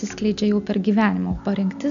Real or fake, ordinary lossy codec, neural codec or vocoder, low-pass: real; MP3, 64 kbps; none; 7.2 kHz